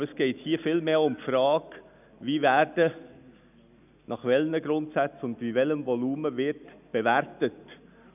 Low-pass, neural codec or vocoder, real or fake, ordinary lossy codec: 3.6 kHz; none; real; none